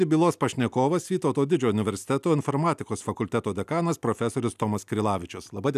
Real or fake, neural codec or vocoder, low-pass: real; none; 14.4 kHz